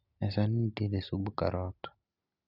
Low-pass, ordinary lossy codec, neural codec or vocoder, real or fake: 5.4 kHz; none; none; real